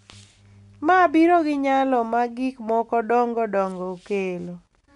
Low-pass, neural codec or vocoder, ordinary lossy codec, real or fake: 10.8 kHz; none; none; real